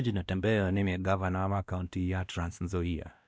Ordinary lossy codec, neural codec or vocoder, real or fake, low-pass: none; codec, 16 kHz, 1 kbps, X-Codec, WavLM features, trained on Multilingual LibriSpeech; fake; none